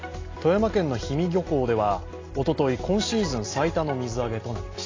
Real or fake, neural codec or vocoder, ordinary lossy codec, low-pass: real; none; AAC, 32 kbps; 7.2 kHz